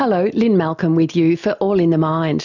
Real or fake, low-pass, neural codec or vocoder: real; 7.2 kHz; none